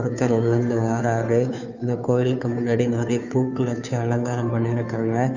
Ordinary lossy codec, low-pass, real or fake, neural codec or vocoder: none; 7.2 kHz; fake; codec, 16 kHz, 2 kbps, FunCodec, trained on Chinese and English, 25 frames a second